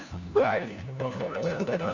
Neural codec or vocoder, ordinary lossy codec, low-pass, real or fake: codec, 16 kHz, 1 kbps, FunCodec, trained on LibriTTS, 50 frames a second; none; 7.2 kHz; fake